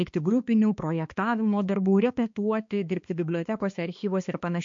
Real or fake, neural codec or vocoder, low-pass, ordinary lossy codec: fake; codec, 16 kHz, 2 kbps, X-Codec, HuBERT features, trained on balanced general audio; 7.2 kHz; MP3, 48 kbps